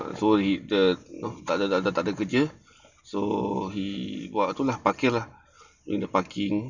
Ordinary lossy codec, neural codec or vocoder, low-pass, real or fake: none; none; 7.2 kHz; real